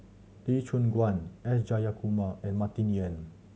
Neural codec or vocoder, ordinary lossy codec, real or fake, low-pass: none; none; real; none